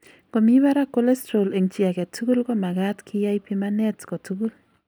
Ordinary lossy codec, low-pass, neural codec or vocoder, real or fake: none; none; none; real